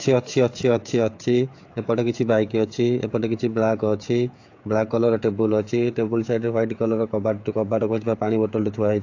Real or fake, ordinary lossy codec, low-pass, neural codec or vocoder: fake; AAC, 48 kbps; 7.2 kHz; codec, 16 kHz, 16 kbps, FreqCodec, smaller model